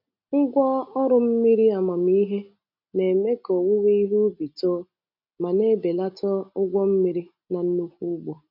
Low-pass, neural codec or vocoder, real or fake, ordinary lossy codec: 5.4 kHz; none; real; none